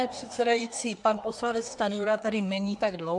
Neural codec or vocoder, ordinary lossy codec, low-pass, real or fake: codec, 24 kHz, 1 kbps, SNAC; AAC, 64 kbps; 10.8 kHz; fake